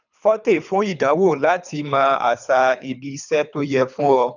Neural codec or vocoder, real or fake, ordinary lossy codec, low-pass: codec, 24 kHz, 3 kbps, HILCodec; fake; none; 7.2 kHz